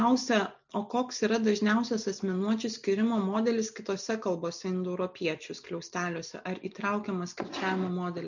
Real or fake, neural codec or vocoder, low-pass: real; none; 7.2 kHz